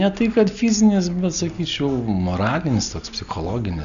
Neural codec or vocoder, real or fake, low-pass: none; real; 7.2 kHz